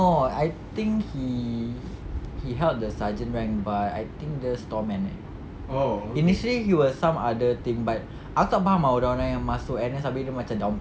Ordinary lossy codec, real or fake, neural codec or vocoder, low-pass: none; real; none; none